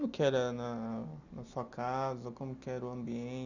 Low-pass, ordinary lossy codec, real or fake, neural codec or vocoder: 7.2 kHz; none; real; none